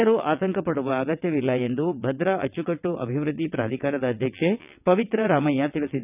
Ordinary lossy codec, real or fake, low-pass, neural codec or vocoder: none; fake; 3.6 kHz; vocoder, 22.05 kHz, 80 mel bands, WaveNeXt